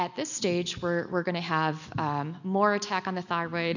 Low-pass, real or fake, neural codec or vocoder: 7.2 kHz; real; none